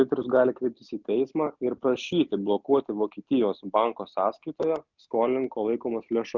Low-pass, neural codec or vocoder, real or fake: 7.2 kHz; none; real